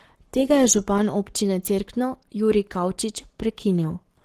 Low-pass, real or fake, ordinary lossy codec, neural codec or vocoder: 14.4 kHz; fake; Opus, 16 kbps; codec, 44.1 kHz, 7.8 kbps, Pupu-Codec